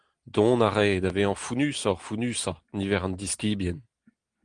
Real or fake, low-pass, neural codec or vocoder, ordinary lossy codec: real; 9.9 kHz; none; Opus, 24 kbps